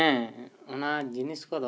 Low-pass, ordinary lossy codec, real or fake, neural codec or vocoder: none; none; real; none